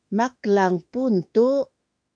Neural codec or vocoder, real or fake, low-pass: autoencoder, 48 kHz, 32 numbers a frame, DAC-VAE, trained on Japanese speech; fake; 9.9 kHz